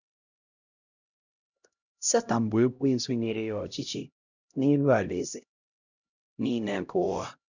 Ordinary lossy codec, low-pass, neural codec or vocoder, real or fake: none; 7.2 kHz; codec, 16 kHz, 0.5 kbps, X-Codec, HuBERT features, trained on LibriSpeech; fake